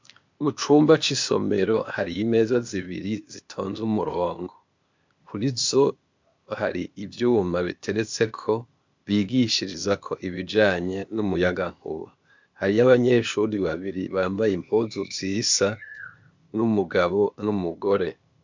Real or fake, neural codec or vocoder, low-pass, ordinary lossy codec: fake; codec, 16 kHz, 0.8 kbps, ZipCodec; 7.2 kHz; MP3, 64 kbps